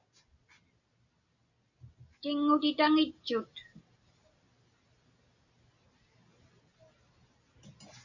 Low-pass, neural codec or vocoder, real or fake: 7.2 kHz; none; real